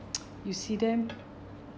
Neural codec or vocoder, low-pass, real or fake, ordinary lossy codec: none; none; real; none